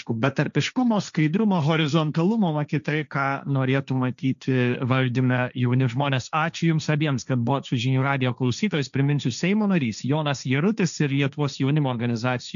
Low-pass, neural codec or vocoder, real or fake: 7.2 kHz; codec, 16 kHz, 1.1 kbps, Voila-Tokenizer; fake